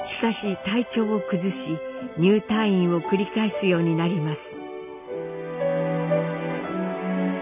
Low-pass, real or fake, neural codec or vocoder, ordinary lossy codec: 3.6 kHz; real; none; none